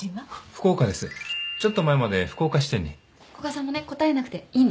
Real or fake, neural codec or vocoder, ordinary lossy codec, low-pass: real; none; none; none